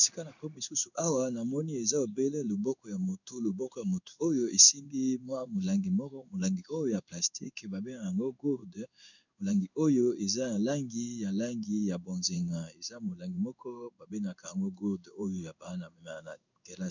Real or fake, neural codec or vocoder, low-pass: fake; codec, 16 kHz in and 24 kHz out, 1 kbps, XY-Tokenizer; 7.2 kHz